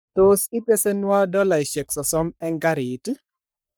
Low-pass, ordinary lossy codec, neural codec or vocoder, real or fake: none; none; codec, 44.1 kHz, 3.4 kbps, Pupu-Codec; fake